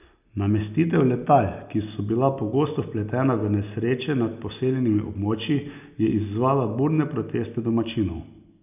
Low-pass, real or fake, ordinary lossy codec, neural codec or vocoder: 3.6 kHz; real; none; none